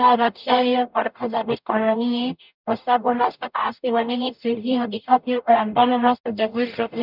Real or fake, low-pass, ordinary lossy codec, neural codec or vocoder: fake; 5.4 kHz; none; codec, 44.1 kHz, 0.9 kbps, DAC